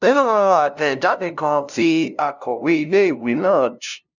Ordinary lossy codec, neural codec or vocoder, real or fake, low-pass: none; codec, 16 kHz, 0.5 kbps, FunCodec, trained on LibriTTS, 25 frames a second; fake; 7.2 kHz